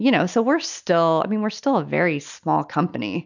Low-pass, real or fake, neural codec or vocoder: 7.2 kHz; real; none